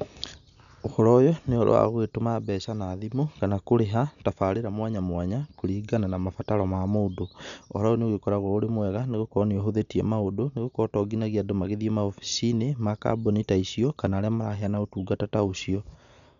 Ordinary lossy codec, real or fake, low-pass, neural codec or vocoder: none; real; 7.2 kHz; none